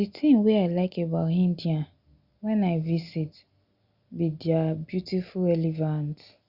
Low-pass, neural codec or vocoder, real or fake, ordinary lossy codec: 5.4 kHz; none; real; Opus, 64 kbps